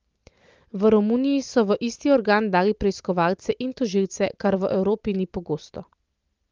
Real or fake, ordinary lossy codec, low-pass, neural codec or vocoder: real; Opus, 32 kbps; 7.2 kHz; none